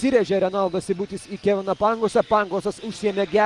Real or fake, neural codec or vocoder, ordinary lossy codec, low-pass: real; none; Opus, 24 kbps; 10.8 kHz